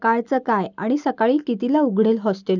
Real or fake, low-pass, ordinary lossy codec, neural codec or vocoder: real; 7.2 kHz; none; none